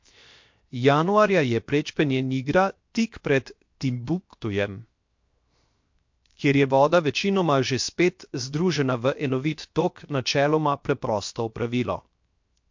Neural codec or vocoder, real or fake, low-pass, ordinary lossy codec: codec, 16 kHz, 0.7 kbps, FocalCodec; fake; 7.2 kHz; MP3, 48 kbps